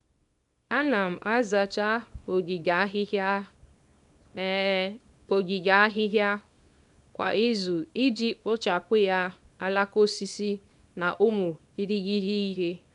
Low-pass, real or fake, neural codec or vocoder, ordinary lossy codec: 10.8 kHz; fake; codec, 24 kHz, 0.9 kbps, WavTokenizer, small release; none